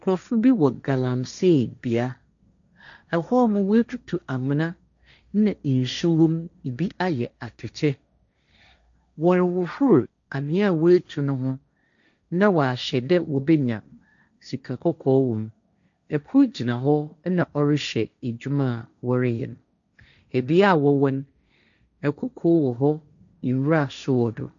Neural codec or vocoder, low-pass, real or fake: codec, 16 kHz, 1.1 kbps, Voila-Tokenizer; 7.2 kHz; fake